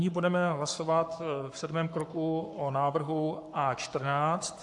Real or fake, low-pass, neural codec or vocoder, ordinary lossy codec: fake; 10.8 kHz; codec, 44.1 kHz, 7.8 kbps, Pupu-Codec; AAC, 64 kbps